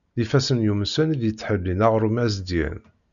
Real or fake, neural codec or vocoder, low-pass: real; none; 7.2 kHz